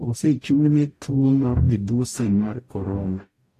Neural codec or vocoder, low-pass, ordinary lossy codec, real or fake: codec, 44.1 kHz, 0.9 kbps, DAC; 14.4 kHz; AAC, 64 kbps; fake